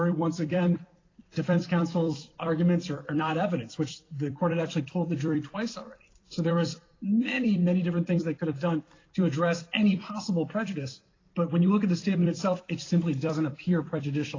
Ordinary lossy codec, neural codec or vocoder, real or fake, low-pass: AAC, 32 kbps; vocoder, 44.1 kHz, 128 mel bands every 256 samples, BigVGAN v2; fake; 7.2 kHz